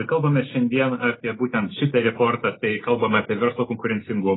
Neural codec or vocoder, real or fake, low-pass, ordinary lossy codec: none; real; 7.2 kHz; AAC, 16 kbps